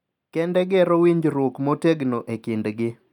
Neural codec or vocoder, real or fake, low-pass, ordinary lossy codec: none; real; 19.8 kHz; none